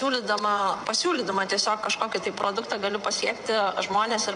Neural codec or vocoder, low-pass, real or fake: vocoder, 22.05 kHz, 80 mel bands, WaveNeXt; 9.9 kHz; fake